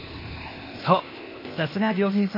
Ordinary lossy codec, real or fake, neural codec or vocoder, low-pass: MP3, 24 kbps; fake; codec, 16 kHz, 0.8 kbps, ZipCodec; 5.4 kHz